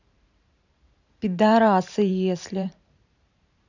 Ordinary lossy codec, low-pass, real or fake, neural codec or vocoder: none; 7.2 kHz; fake; vocoder, 44.1 kHz, 128 mel bands every 512 samples, BigVGAN v2